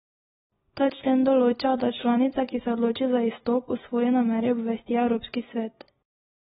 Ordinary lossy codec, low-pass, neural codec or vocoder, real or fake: AAC, 16 kbps; 19.8 kHz; none; real